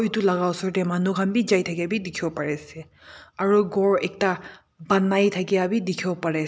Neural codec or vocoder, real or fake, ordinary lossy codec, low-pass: none; real; none; none